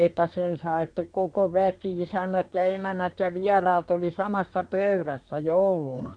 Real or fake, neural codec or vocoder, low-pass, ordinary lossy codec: fake; codec, 24 kHz, 1 kbps, SNAC; 9.9 kHz; none